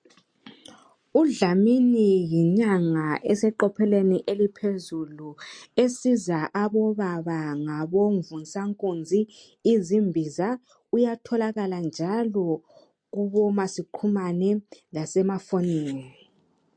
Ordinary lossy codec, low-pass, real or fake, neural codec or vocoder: MP3, 48 kbps; 9.9 kHz; real; none